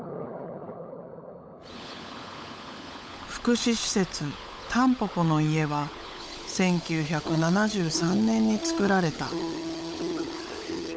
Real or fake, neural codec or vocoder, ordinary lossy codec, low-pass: fake; codec, 16 kHz, 16 kbps, FunCodec, trained on LibriTTS, 50 frames a second; none; none